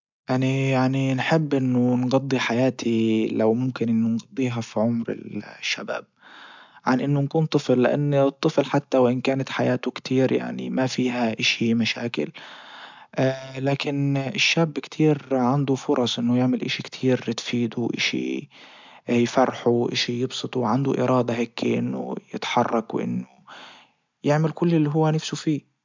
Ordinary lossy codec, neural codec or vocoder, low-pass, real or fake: MP3, 64 kbps; none; 7.2 kHz; real